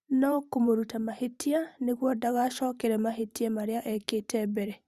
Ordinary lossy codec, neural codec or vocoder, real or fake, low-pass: Opus, 64 kbps; vocoder, 44.1 kHz, 128 mel bands every 512 samples, BigVGAN v2; fake; 14.4 kHz